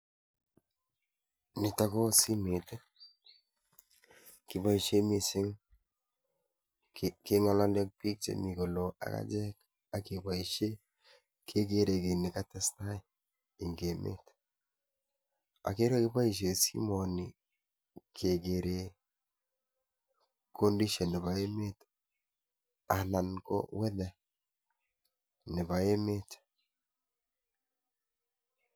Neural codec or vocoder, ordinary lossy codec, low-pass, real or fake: none; none; none; real